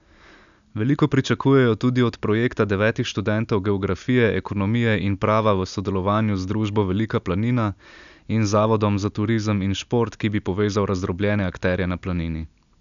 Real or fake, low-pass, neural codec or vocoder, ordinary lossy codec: real; 7.2 kHz; none; none